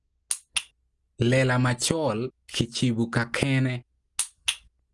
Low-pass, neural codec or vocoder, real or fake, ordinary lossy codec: 10.8 kHz; none; real; Opus, 24 kbps